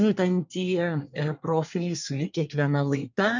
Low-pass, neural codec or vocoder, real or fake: 7.2 kHz; codec, 24 kHz, 1 kbps, SNAC; fake